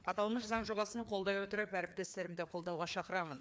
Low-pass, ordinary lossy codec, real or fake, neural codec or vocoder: none; none; fake; codec, 16 kHz, 2 kbps, FreqCodec, larger model